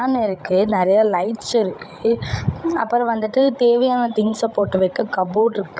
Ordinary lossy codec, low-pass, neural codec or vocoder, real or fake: none; none; codec, 16 kHz, 16 kbps, FreqCodec, larger model; fake